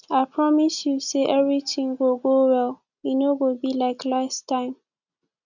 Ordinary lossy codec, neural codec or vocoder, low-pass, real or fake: none; none; 7.2 kHz; real